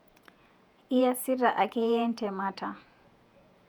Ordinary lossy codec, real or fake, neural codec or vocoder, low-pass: none; fake; vocoder, 48 kHz, 128 mel bands, Vocos; 19.8 kHz